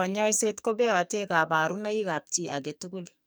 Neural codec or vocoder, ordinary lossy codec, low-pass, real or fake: codec, 44.1 kHz, 2.6 kbps, SNAC; none; none; fake